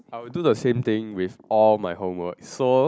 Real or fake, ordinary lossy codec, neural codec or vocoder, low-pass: real; none; none; none